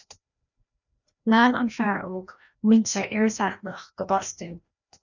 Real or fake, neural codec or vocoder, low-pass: fake; codec, 16 kHz, 1 kbps, FreqCodec, larger model; 7.2 kHz